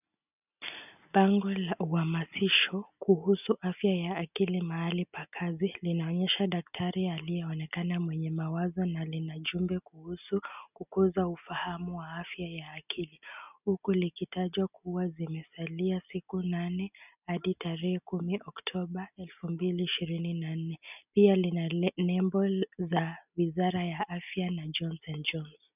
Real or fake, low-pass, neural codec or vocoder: real; 3.6 kHz; none